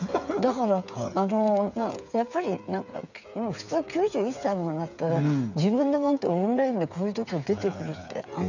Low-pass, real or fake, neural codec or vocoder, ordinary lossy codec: 7.2 kHz; fake; codec, 16 kHz, 8 kbps, FreqCodec, smaller model; none